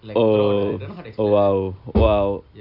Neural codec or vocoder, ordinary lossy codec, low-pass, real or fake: none; none; 5.4 kHz; real